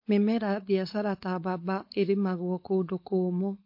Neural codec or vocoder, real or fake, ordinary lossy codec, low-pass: codec, 16 kHz, 4.8 kbps, FACodec; fake; MP3, 32 kbps; 5.4 kHz